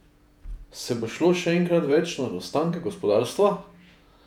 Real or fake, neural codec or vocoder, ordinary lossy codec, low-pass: fake; vocoder, 48 kHz, 128 mel bands, Vocos; none; 19.8 kHz